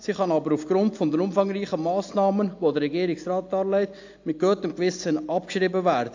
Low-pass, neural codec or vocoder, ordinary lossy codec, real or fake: 7.2 kHz; none; AAC, 48 kbps; real